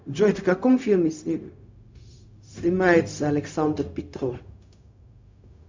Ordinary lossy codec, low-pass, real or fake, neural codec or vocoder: MP3, 64 kbps; 7.2 kHz; fake; codec, 16 kHz, 0.4 kbps, LongCat-Audio-Codec